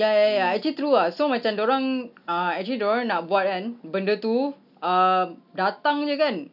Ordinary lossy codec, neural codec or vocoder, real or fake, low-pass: none; none; real; 5.4 kHz